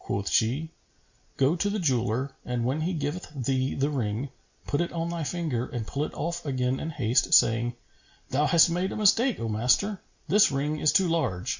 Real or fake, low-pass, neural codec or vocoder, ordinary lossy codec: real; 7.2 kHz; none; Opus, 64 kbps